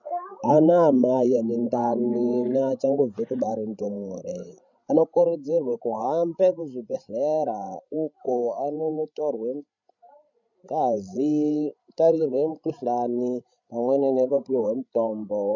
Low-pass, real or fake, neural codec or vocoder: 7.2 kHz; fake; codec, 16 kHz, 16 kbps, FreqCodec, larger model